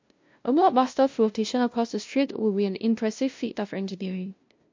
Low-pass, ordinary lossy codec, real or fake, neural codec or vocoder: 7.2 kHz; MP3, 48 kbps; fake; codec, 16 kHz, 0.5 kbps, FunCodec, trained on LibriTTS, 25 frames a second